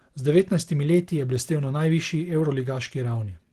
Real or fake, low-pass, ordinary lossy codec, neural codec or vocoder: real; 14.4 kHz; Opus, 16 kbps; none